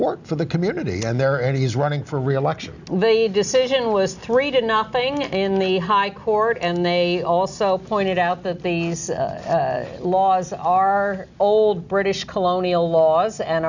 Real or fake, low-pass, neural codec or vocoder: real; 7.2 kHz; none